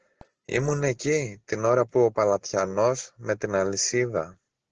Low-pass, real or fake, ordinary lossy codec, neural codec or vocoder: 7.2 kHz; real; Opus, 16 kbps; none